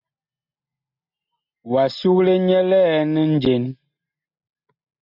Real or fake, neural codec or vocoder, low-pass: real; none; 5.4 kHz